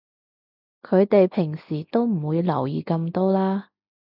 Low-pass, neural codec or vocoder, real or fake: 5.4 kHz; none; real